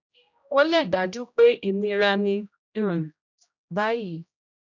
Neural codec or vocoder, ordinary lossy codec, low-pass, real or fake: codec, 16 kHz, 0.5 kbps, X-Codec, HuBERT features, trained on general audio; none; 7.2 kHz; fake